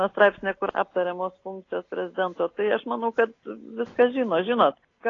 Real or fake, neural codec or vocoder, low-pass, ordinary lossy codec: real; none; 7.2 kHz; AAC, 32 kbps